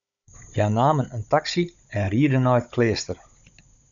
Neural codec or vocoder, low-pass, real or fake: codec, 16 kHz, 16 kbps, FunCodec, trained on Chinese and English, 50 frames a second; 7.2 kHz; fake